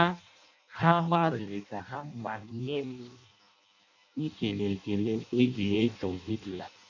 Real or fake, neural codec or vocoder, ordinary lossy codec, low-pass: fake; codec, 16 kHz in and 24 kHz out, 0.6 kbps, FireRedTTS-2 codec; none; 7.2 kHz